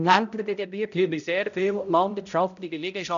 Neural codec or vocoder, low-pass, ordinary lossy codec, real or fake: codec, 16 kHz, 0.5 kbps, X-Codec, HuBERT features, trained on balanced general audio; 7.2 kHz; none; fake